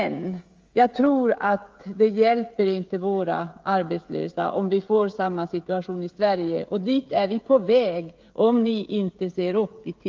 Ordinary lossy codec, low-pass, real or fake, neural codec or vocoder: Opus, 32 kbps; 7.2 kHz; fake; codec, 16 kHz, 16 kbps, FreqCodec, smaller model